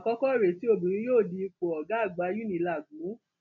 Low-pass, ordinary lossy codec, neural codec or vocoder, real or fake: 7.2 kHz; none; none; real